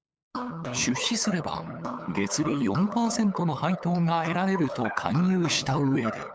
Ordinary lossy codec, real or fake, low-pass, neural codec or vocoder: none; fake; none; codec, 16 kHz, 8 kbps, FunCodec, trained on LibriTTS, 25 frames a second